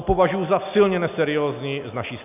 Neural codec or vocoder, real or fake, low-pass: none; real; 3.6 kHz